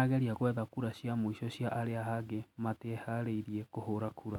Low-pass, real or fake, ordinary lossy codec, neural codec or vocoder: 19.8 kHz; real; none; none